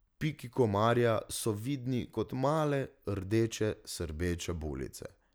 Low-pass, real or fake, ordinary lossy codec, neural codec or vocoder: none; real; none; none